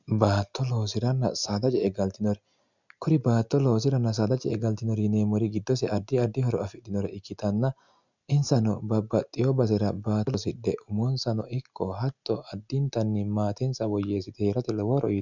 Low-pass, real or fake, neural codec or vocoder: 7.2 kHz; real; none